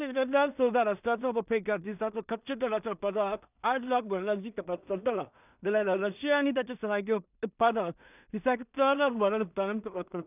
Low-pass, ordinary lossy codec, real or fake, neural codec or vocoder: 3.6 kHz; none; fake; codec, 16 kHz in and 24 kHz out, 0.4 kbps, LongCat-Audio-Codec, two codebook decoder